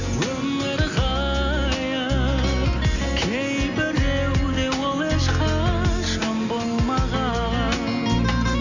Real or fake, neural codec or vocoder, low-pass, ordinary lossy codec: real; none; 7.2 kHz; none